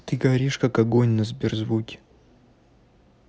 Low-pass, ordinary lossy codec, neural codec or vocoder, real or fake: none; none; none; real